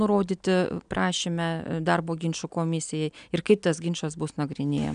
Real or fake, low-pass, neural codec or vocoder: real; 9.9 kHz; none